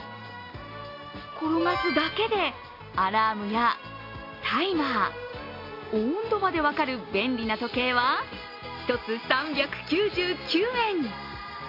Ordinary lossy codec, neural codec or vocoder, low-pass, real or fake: AAC, 32 kbps; none; 5.4 kHz; real